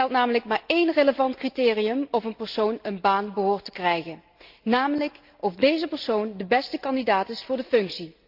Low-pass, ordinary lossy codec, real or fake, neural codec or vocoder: 5.4 kHz; Opus, 24 kbps; real; none